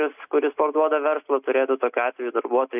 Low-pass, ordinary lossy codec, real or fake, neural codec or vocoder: 3.6 kHz; AAC, 32 kbps; real; none